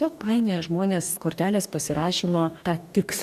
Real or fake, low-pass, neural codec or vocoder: fake; 14.4 kHz; codec, 44.1 kHz, 2.6 kbps, DAC